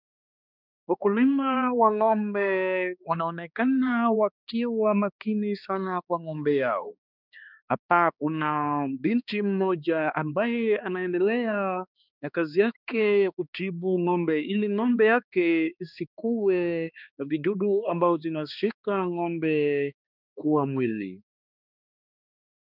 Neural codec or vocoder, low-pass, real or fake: codec, 16 kHz, 2 kbps, X-Codec, HuBERT features, trained on balanced general audio; 5.4 kHz; fake